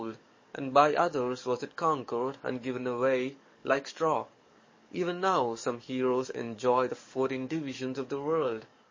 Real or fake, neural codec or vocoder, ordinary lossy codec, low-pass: fake; codec, 44.1 kHz, 7.8 kbps, DAC; MP3, 32 kbps; 7.2 kHz